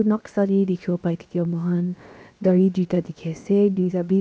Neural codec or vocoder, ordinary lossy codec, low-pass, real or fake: codec, 16 kHz, 0.7 kbps, FocalCodec; none; none; fake